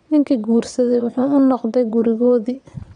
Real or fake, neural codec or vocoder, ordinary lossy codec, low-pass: fake; vocoder, 22.05 kHz, 80 mel bands, WaveNeXt; none; 9.9 kHz